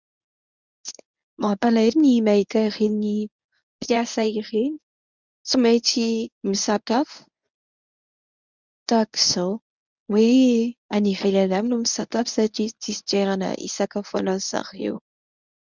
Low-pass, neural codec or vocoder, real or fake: 7.2 kHz; codec, 24 kHz, 0.9 kbps, WavTokenizer, medium speech release version 1; fake